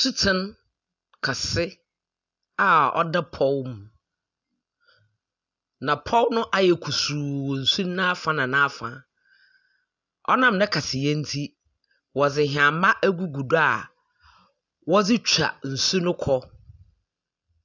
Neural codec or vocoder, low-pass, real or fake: none; 7.2 kHz; real